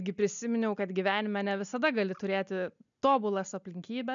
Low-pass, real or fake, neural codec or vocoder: 7.2 kHz; real; none